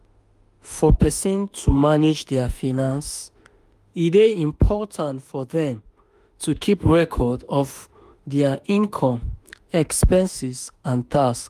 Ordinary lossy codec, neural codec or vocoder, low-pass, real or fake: Opus, 32 kbps; autoencoder, 48 kHz, 32 numbers a frame, DAC-VAE, trained on Japanese speech; 14.4 kHz; fake